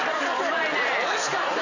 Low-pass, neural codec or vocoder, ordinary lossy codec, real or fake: 7.2 kHz; vocoder, 44.1 kHz, 128 mel bands every 512 samples, BigVGAN v2; none; fake